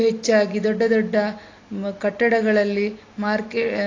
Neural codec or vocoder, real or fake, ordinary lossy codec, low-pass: none; real; AAC, 32 kbps; 7.2 kHz